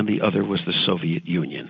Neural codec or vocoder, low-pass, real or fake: none; 7.2 kHz; real